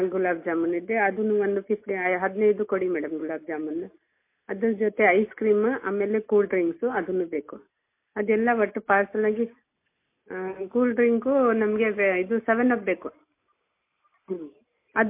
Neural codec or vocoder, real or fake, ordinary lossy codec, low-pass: none; real; MP3, 24 kbps; 3.6 kHz